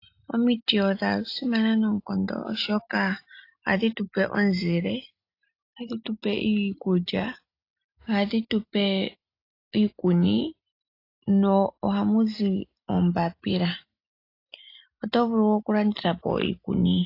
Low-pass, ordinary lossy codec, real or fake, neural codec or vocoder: 5.4 kHz; AAC, 32 kbps; real; none